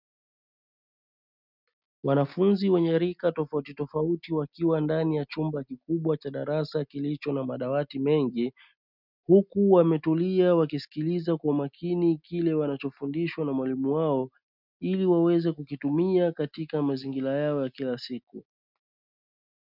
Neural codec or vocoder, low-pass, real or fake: none; 5.4 kHz; real